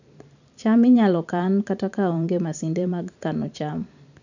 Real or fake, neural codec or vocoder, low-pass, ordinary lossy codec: fake; vocoder, 44.1 kHz, 128 mel bands every 512 samples, BigVGAN v2; 7.2 kHz; none